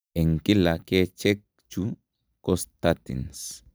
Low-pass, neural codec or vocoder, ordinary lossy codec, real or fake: none; none; none; real